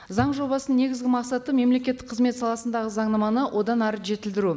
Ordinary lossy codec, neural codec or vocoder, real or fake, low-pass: none; none; real; none